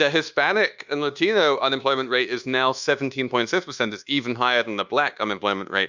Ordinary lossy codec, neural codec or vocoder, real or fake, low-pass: Opus, 64 kbps; codec, 24 kHz, 1.2 kbps, DualCodec; fake; 7.2 kHz